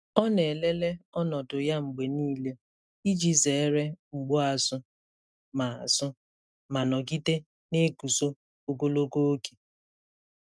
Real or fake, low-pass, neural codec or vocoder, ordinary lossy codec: real; none; none; none